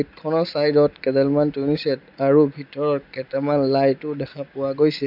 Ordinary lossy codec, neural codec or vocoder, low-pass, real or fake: AAC, 48 kbps; none; 5.4 kHz; real